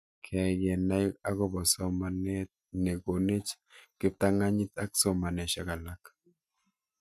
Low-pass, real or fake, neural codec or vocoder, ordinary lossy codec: 14.4 kHz; real; none; none